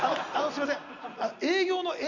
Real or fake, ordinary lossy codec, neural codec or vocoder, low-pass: real; none; none; 7.2 kHz